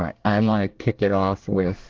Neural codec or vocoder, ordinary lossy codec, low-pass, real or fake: codec, 24 kHz, 1 kbps, SNAC; Opus, 16 kbps; 7.2 kHz; fake